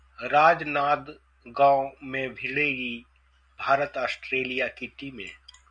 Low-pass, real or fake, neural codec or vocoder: 9.9 kHz; real; none